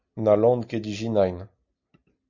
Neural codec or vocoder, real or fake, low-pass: none; real; 7.2 kHz